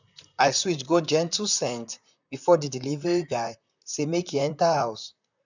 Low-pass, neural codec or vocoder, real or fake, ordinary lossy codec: 7.2 kHz; vocoder, 44.1 kHz, 128 mel bands, Pupu-Vocoder; fake; none